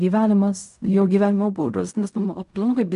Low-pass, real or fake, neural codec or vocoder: 10.8 kHz; fake; codec, 16 kHz in and 24 kHz out, 0.4 kbps, LongCat-Audio-Codec, fine tuned four codebook decoder